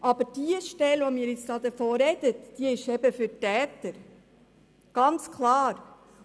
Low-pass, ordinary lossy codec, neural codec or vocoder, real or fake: none; none; none; real